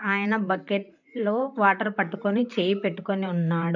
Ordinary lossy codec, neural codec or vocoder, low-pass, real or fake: none; vocoder, 44.1 kHz, 128 mel bands, Pupu-Vocoder; 7.2 kHz; fake